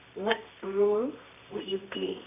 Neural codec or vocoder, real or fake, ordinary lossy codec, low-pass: codec, 24 kHz, 0.9 kbps, WavTokenizer, medium music audio release; fake; none; 3.6 kHz